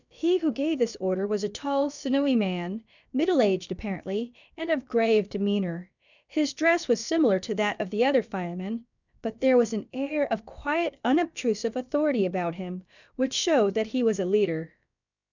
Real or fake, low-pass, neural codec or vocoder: fake; 7.2 kHz; codec, 16 kHz, about 1 kbps, DyCAST, with the encoder's durations